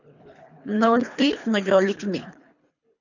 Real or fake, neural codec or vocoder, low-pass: fake; codec, 24 kHz, 3 kbps, HILCodec; 7.2 kHz